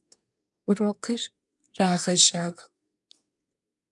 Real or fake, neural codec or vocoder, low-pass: fake; codec, 24 kHz, 1 kbps, SNAC; 10.8 kHz